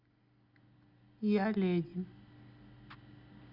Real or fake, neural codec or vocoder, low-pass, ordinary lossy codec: real; none; 5.4 kHz; Opus, 64 kbps